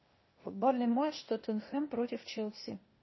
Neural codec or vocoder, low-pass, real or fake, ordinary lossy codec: codec, 16 kHz, 0.8 kbps, ZipCodec; 7.2 kHz; fake; MP3, 24 kbps